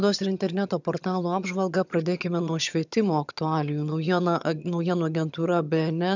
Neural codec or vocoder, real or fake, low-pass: vocoder, 22.05 kHz, 80 mel bands, HiFi-GAN; fake; 7.2 kHz